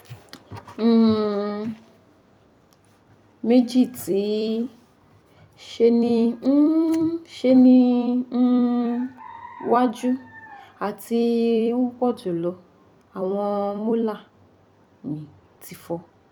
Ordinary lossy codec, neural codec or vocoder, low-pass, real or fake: none; vocoder, 44.1 kHz, 128 mel bands every 256 samples, BigVGAN v2; 19.8 kHz; fake